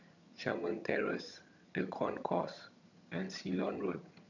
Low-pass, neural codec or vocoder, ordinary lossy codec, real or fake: 7.2 kHz; vocoder, 22.05 kHz, 80 mel bands, HiFi-GAN; none; fake